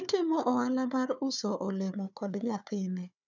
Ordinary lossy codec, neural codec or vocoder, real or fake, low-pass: none; codec, 16 kHz, 4 kbps, FunCodec, trained on Chinese and English, 50 frames a second; fake; 7.2 kHz